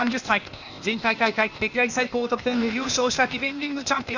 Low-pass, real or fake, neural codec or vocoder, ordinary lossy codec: 7.2 kHz; fake; codec, 16 kHz, 0.8 kbps, ZipCodec; none